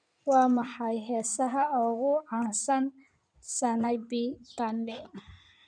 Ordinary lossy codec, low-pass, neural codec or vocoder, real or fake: none; 9.9 kHz; codec, 16 kHz in and 24 kHz out, 2.2 kbps, FireRedTTS-2 codec; fake